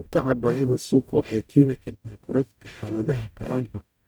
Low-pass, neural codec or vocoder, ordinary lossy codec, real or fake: none; codec, 44.1 kHz, 0.9 kbps, DAC; none; fake